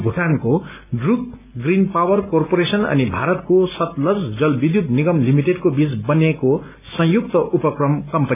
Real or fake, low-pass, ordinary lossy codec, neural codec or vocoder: real; 3.6 kHz; AAC, 32 kbps; none